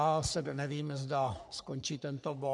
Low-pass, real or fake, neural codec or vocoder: 10.8 kHz; fake; codec, 44.1 kHz, 3.4 kbps, Pupu-Codec